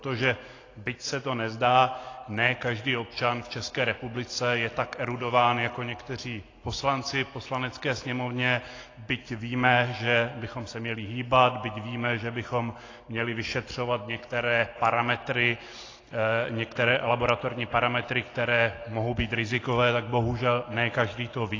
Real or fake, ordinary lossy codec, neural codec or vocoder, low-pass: real; AAC, 32 kbps; none; 7.2 kHz